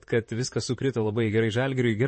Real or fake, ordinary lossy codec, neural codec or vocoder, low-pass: fake; MP3, 32 kbps; vocoder, 44.1 kHz, 128 mel bands, Pupu-Vocoder; 9.9 kHz